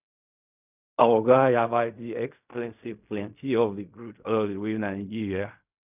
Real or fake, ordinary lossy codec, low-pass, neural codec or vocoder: fake; none; 3.6 kHz; codec, 16 kHz in and 24 kHz out, 0.4 kbps, LongCat-Audio-Codec, fine tuned four codebook decoder